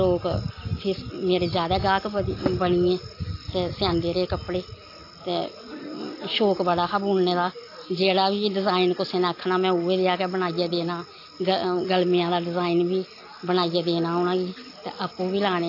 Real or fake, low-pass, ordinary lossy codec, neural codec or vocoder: real; 5.4 kHz; AAC, 32 kbps; none